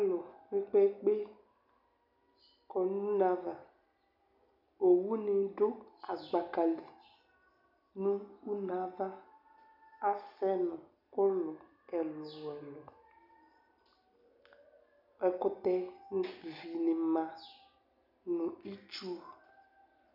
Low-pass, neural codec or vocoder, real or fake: 5.4 kHz; none; real